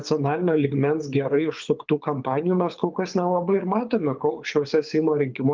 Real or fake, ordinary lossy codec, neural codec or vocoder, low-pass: fake; Opus, 32 kbps; codec, 24 kHz, 6 kbps, HILCodec; 7.2 kHz